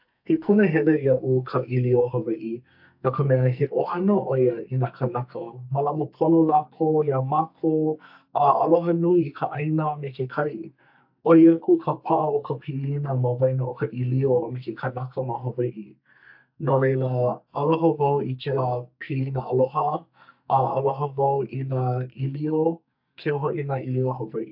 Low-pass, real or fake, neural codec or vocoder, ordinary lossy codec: 5.4 kHz; fake; codec, 44.1 kHz, 2.6 kbps, SNAC; AAC, 48 kbps